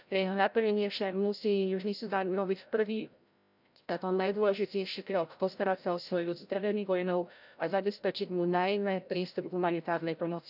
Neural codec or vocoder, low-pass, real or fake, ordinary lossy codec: codec, 16 kHz, 0.5 kbps, FreqCodec, larger model; 5.4 kHz; fake; none